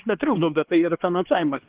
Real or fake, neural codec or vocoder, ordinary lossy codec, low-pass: fake; codec, 16 kHz, 2 kbps, X-Codec, HuBERT features, trained on LibriSpeech; Opus, 16 kbps; 3.6 kHz